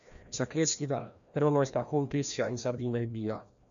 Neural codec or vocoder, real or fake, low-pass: codec, 16 kHz, 1 kbps, FreqCodec, larger model; fake; 7.2 kHz